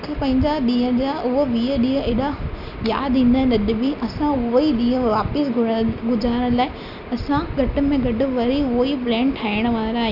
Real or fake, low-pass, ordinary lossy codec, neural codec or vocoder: real; 5.4 kHz; none; none